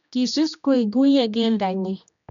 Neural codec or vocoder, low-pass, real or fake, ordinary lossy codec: codec, 16 kHz, 1 kbps, X-Codec, HuBERT features, trained on general audio; 7.2 kHz; fake; MP3, 96 kbps